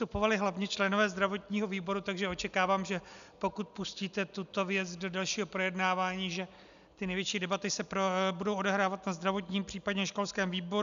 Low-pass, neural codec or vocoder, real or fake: 7.2 kHz; none; real